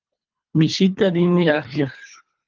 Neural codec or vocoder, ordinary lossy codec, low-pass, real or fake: codec, 24 kHz, 3 kbps, HILCodec; Opus, 32 kbps; 7.2 kHz; fake